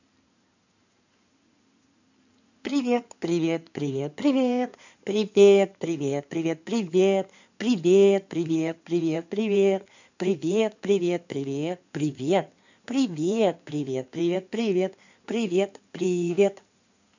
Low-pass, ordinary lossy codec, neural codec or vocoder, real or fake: 7.2 kHz; none; codec, 16 kHz in and 24 kHz out, 2.2 kbps, FireRedTTS-2 codec; fake